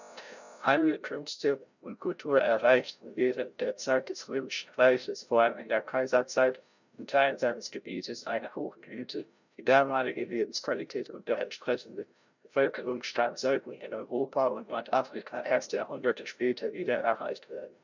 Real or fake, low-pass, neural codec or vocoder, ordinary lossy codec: fake; 7.2 kHz; codec, 16 kHz, 0.5 kbps, FreqCodec, larger model; none